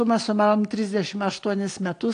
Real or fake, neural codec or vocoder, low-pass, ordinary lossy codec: real; none; 9.9 kHz; AAC, 48 kbps